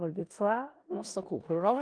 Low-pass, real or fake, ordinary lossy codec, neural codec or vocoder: 9.9 kHz; fake; Opus, 24 kbps; codec, 16 kHz in and 24 kHz out, 0.4 kbps, LongCat-Audio-Codec, four codebook decoder